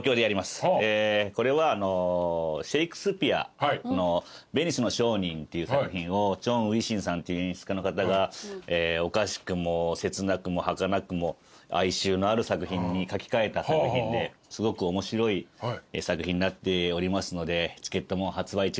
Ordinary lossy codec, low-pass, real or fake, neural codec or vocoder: none; none; real; none